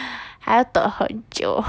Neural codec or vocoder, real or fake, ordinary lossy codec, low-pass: none; real; none; none